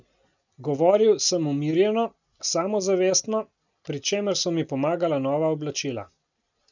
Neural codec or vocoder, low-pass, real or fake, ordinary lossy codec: none; 7.2 kHz; real; none